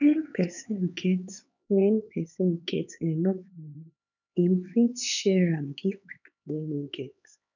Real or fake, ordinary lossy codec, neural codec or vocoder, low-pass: fake; none; codec, 16 kHz, 4 kbps, X-Codec, HuBERT features, trained on LibriSpeech; 7.2 kHz